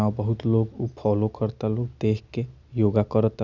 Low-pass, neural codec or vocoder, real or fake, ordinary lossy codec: 7.2 kHz; none; real; none